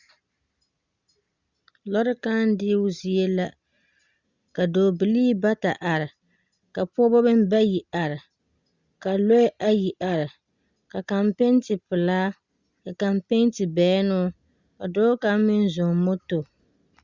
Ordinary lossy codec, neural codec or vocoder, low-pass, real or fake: Opus, 64 kbps; none; 7.2 kHz; real